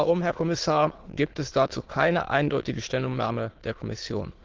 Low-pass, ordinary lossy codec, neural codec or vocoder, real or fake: 7.2 kHz; Opus, 16 kbps; autoencoder, 22.05 kHz, a latent of 192 numbers a frame, VITS, trained on many speakers; fake